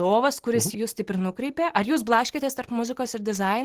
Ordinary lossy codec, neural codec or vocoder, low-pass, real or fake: Opus, 16 kbps; none; 14.4 kHz; real